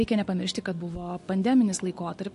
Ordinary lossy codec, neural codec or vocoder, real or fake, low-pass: MP3, 48 kbps; none; real; 10.8 kHz